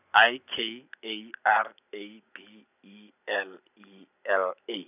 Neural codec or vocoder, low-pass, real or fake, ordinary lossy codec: none; 3.6 kHz; real; none